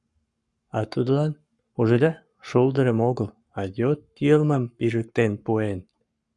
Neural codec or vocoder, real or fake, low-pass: codec, 44.1 kHz, 7.8 kbps, Pupu-Codec; fake; 10.8 kHz